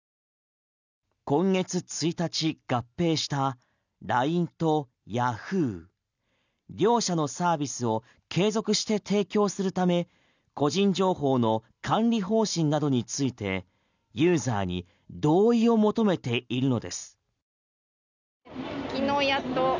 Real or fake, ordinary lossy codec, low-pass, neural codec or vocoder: real; none; 7.2 kHz; none